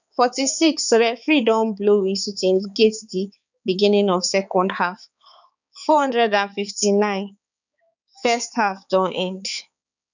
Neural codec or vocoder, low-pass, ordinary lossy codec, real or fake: codec, 16 kHz, 4 kbps, X-Codec, HuBERT features, trained on balanced general audio; 7.2 kHz; none; fake